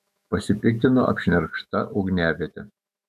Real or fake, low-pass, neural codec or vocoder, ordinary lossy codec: fake; 14.4 kHz; autoencoder, 48 kHz, 128 numbers a frame, DAC-VAE, trained on Japanese speech; MP3, 96 kbps